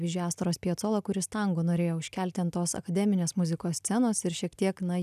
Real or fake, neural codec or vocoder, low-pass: real; none; 14.4 kHz